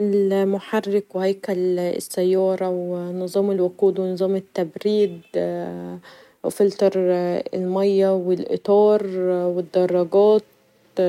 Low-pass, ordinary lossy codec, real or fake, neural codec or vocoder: 19.8 kHz; none; real; none